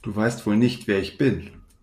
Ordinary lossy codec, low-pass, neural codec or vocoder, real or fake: MP3, 96 kbps; 14.4 kHz; none; real